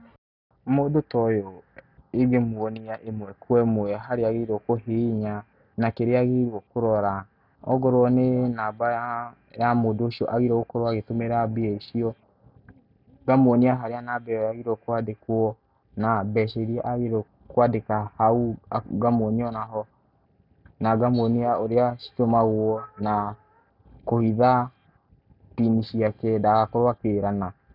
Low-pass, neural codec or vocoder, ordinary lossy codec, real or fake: 5.4 kHz; none; none; real